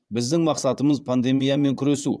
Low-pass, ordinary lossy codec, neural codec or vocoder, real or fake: none; none; vocoder, 22.05 kHz, 80 mel bands, Vocos; fake